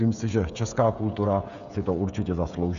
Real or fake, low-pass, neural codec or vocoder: fake; 7.2 kHz; codec, 16 kHz, 16 kbps, FreqCodec, smaller model